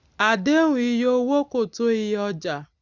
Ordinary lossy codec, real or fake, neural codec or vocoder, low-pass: none; real; none; 7.2 kHz